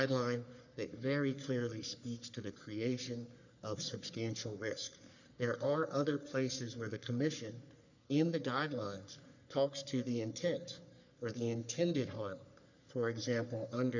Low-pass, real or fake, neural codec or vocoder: 7.2 kHz; fake; codec, 44.1 kHz, 3.4 kbps, Pupu-Codec